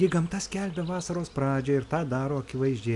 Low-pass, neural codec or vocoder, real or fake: 10.8 kHz; none; real